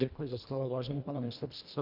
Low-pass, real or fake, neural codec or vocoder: 5.4 kHz; fake; codec, 24 kHz, 1.5 kbps, HILCodec